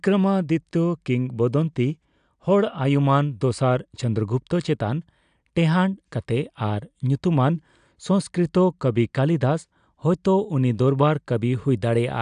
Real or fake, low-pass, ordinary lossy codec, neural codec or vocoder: real; 9.9 kHz; AAC, 96 kbps; none